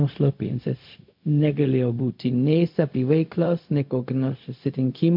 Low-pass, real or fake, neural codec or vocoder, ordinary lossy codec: 5.4 kHz; fake; codec, 16 kHz, 0.4 kbps, LongCat-Audio-Codec; MP3, 48 kbps